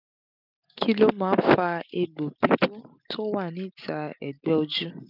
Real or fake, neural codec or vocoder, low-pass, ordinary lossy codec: real; none; 5.4 kHz; none